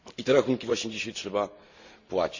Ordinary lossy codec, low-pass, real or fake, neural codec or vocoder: Opus, 64 kbps; 7.2 kHz; real; none